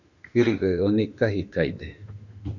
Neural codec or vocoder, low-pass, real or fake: autoencoder, 48 kHz, 32 numbers a frame, DAC-VAE, trained on Japanese speech; 7.2 kHz; fake